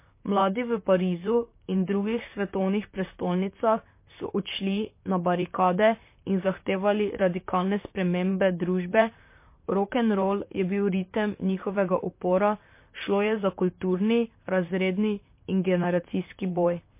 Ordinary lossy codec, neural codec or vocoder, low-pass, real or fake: MP3, 24 kbps; vocoder, 44.1 kHz, 128 mel bands, Pupu-Vocoder; 3.6 kHz; fake